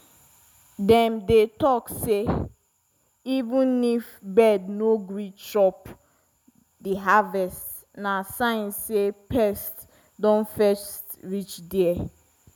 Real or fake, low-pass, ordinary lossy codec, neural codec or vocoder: real; none; none; none